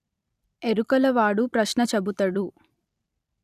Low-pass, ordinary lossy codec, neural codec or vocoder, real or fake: 14.4 kHz; none; vocoder, 44.1 kHz, 128 mel bands every 256 samples, BigVGAN v2; fake